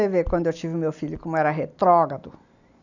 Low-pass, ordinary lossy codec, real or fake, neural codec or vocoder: 7.2 kHz; none; real; none